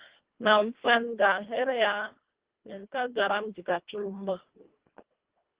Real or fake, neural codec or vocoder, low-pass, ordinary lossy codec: fake; codec, 24 kHz, 1.5 kbps, HILCodec; 3.6 kHz; Opus, 16 kbps